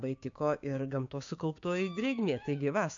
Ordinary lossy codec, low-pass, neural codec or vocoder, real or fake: AAC, 64 kbps; 7.2 kHz; codec, 16 kHz, 6 kbps, DAC; fake